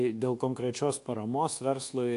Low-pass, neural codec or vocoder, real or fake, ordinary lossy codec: 10.8 kHz; codec, 24 kHz, 1.2 kbps, DualCodec; fake; AAC, 48 kbps